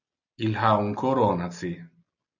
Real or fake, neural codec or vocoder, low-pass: real; none; 7.2 kHz